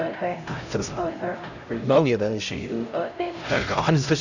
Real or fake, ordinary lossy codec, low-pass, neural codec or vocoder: fake; none; 7.2 kHz; codec, 16 kHz, 0.5 kbps, X-Codec, HuBERT features, trained on LibriSpeech